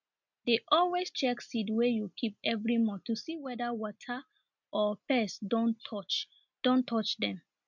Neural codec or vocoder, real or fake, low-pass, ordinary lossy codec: none; real; 7.2 kHz; MP3, 64 kbps